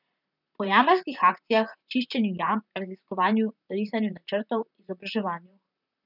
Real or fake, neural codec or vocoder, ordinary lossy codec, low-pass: fake; vocoder, 44.1 kHz, 128 mel bands, Pupu-Vocoder; none; 5.4 kHz